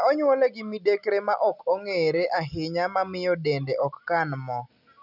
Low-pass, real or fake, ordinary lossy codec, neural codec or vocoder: 5.4 kHz; real; none; none